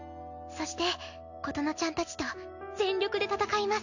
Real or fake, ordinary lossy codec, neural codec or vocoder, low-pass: real; none; none; 7.2 kHz